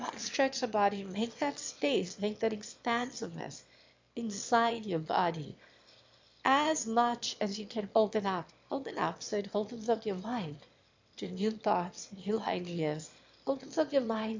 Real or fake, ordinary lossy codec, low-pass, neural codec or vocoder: fake; MP3, 64 kbps; 7.2 kHz; autoencoder, 22.05 kHz, a latent of 192 numbers a frame, VITS, trained on one speaker